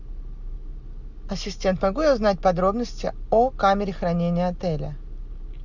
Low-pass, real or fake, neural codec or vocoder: 7.2 kHz; real; none